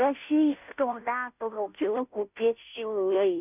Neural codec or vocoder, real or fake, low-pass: codec, 16 kHz, 0.5 kbps, FunCodec, trained on Chinese and English, 25 frames a second; fake; 3.6 kHz